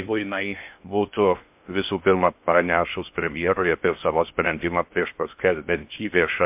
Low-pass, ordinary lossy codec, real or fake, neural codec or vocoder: 3.6 kHz; MP3, 32 kbps; fake; codec, 16 kHz in and 24 kHz out, 0.6 kbps, FocalCodec, streaming, 2048 codes